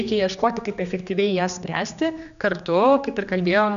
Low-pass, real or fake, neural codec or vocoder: 7.2 kHz; fake; codec, 16 kHz, 2 kbps, X-Codec, HuBERT features, trained on general audio